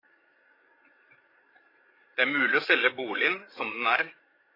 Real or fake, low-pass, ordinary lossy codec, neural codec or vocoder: fake; 5.4 kHz; AAC, 24 kbps; codec, 16 kHz, 8 kbps, FreqCodec, larger model